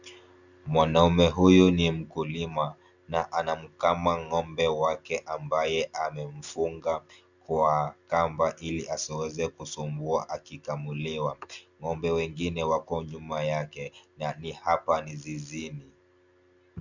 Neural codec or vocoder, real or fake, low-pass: none; real; 7.2 kHz